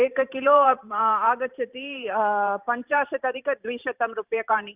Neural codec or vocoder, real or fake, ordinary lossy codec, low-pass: vocoder, 44.1 kHz, 128 mel bands every 512 samples, BigVGAN v2; fake; Opus, 64 kbps; 3.6 kHz